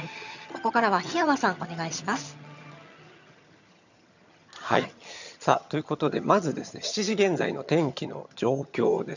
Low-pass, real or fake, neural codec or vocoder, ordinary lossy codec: 7.2 kHz; fake; vocoder, 22.05 kHz, 80 mel bands, HiFi-GAN; none